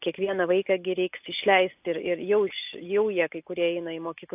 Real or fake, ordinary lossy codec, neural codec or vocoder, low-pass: real; AAC, 32 kbps; none; 3.6 kHz